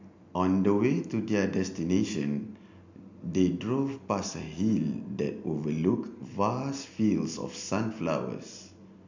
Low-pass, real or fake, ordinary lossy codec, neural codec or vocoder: 7.2 kHz; real; MP3, 64 kbps; none